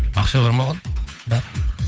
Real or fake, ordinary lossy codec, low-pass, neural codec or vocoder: fake; none; none; codec, 16 kHz, 8 kbps, FunCodec, trained on Chinese and English, 25 frames a second